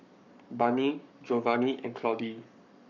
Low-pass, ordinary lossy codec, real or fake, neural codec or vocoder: 7.2 kHz; none; fake; codec, 44.1 kHz, 7.8 kbps, Pupu-Codec